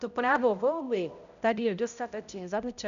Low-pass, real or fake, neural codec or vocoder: 7.2 kHz; fake; codec, 16 kHz, 0.5 kbps, X-Codec, HuBERT features, trained on balanced general audio